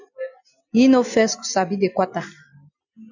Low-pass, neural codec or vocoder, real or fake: 7.2 kHz; none; real